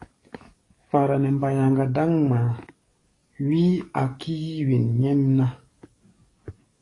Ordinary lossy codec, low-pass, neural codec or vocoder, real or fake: AAC, 32 kbps; 10.8 kHz; codec, 44.1 kHz, 7.8 kbps, DAC; fake